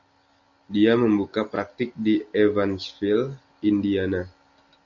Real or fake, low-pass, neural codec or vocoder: real; 7.2 kHz; none